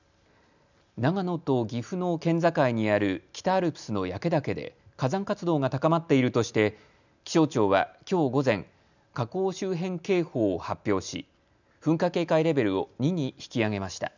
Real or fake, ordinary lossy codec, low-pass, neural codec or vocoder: real; none; 7.2 kHz; none